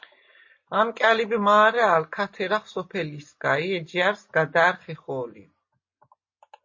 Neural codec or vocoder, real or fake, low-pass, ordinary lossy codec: none; real; 7.2 kHz; MP3, 32 kbps